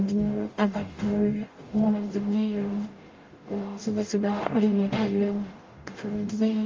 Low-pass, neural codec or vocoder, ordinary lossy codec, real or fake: 7.2 kHz; codec, 44.1 kHz, 0.9 kbps, DAC; Opus, 32 kbps; fake